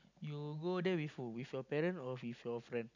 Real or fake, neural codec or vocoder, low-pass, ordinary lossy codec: real; none; 7.2 kHz; none